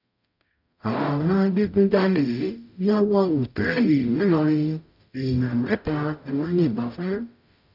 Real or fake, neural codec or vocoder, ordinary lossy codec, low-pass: fake; codec, 44.1 kHz, 0.9 kbps, DAC; none; 5.4 kHz